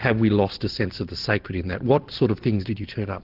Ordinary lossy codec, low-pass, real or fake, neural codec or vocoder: Opus, 16 kbps; 5.4 kHz; real; none